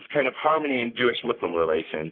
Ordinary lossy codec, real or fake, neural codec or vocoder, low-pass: Opus, 32 kbps; fake; codec, 44.1 kHz, 3.4 kbps, Pupu-Codec; 5.4 kHz